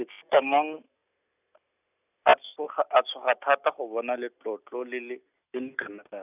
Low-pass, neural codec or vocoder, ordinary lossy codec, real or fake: 3.6 kHz; none; none; real